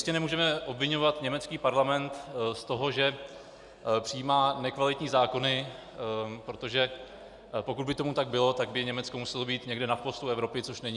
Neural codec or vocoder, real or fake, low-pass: none; real; 10.8 kHz